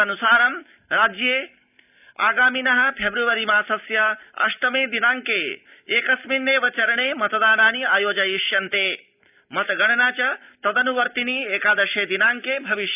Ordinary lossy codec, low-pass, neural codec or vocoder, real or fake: none; 3.6 kHz; none; real